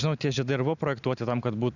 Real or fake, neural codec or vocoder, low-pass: real; none; 7.2 kHz